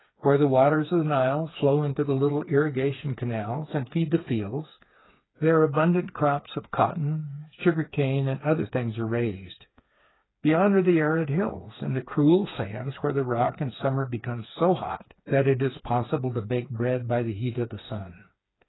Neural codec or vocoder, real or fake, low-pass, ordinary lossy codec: codec, 16 kHz, 4 kbps, FreqCodec, smaller model; fake; 7.2 kHz; AAC, 16 kbps